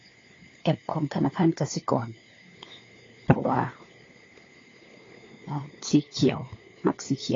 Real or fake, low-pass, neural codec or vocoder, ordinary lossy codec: fake; 7.2 kHz; codec, 16 kHz, 4 kbps, FunCodec, trained on LibriTTS, 50 frames a second; AAC, 32 kbps